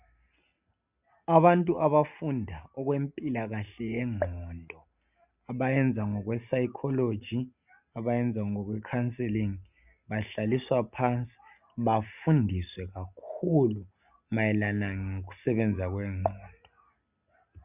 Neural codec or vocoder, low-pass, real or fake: none; 3.6 kHz; real